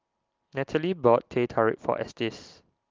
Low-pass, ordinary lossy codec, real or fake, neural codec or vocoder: 7.2 kHz; Opus, 32 kbps; real; none